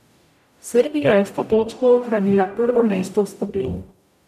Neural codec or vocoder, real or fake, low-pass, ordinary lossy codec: codec, 44.1 kHz, 0.9 kbps, DAC; fake; 14.4 kHz; none